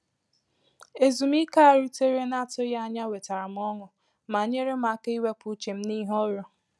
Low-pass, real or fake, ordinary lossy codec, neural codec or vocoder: none; real; none; none